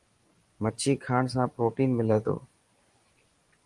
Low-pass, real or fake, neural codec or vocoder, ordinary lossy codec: 10.8 kHz; fake; vocoder, 24 kHz, 100 mel bands, Vocos; Opus, 24 kbps